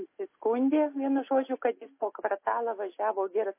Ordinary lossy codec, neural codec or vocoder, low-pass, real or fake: MP3, 24 kbps; none; 3.6 kHz; real